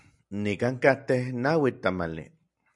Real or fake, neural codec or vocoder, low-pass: real; none; 10.8 kHz